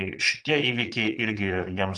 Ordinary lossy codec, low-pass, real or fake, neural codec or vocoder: AAC, 64 kbps; 9.9 kHz; fake; vocoder, 22.05 kHz, 80 mel bands, Vocos